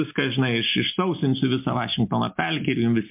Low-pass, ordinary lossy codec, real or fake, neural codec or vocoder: 3.6 kHz; MP3, 32 kbps; real; none